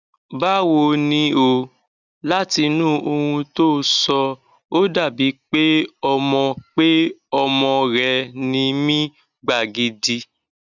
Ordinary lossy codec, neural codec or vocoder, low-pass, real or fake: none; none; 7.2 kHz; real